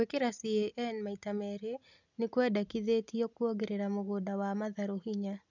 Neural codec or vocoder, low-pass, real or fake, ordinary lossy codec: none; 7.2 kHz; real; none